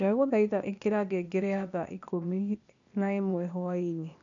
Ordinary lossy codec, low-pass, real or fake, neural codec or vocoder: none; 7.2 kHz; fake; codec, 16 kHz, 0.8 kbps, ZipCodec